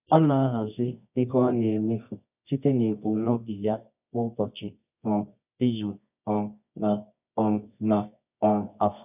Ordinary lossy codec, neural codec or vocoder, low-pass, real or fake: none; codec, 24 kHz, 0.9 kbps, WavTokenizer, medium music audio release; 3.6 kHz; fake